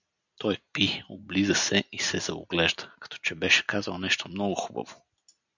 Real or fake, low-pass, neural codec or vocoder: real; 7.2 kHz; none